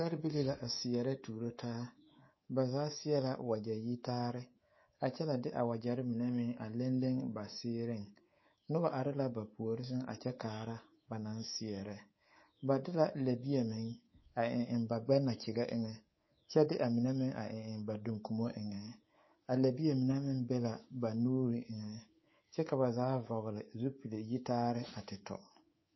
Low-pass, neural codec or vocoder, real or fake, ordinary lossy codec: 7.2 kHz; codec, 24 kHz, 3.1 kbps, DualCodec; fake; MP3, 24 kbps